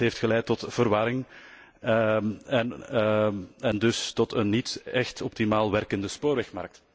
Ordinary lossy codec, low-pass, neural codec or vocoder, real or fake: none; none; none; real